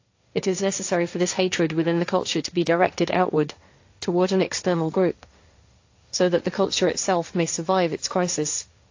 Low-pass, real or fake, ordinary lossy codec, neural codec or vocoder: 7.2 kHz; fake; AAC, 48 kbps; codec, 16 kHz, 1.1 kbps, Voila-Tokenizer